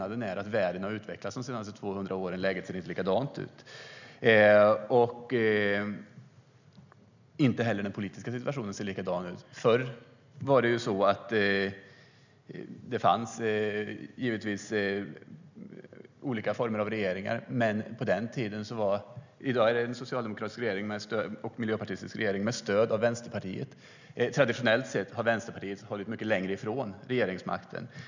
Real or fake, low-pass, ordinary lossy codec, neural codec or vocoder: real; 7.2 kHz; none; none